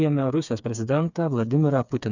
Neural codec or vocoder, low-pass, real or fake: codec, 16 kHz, 4 kbps, FreqCodec, smaller model; 7.2 kHz; fake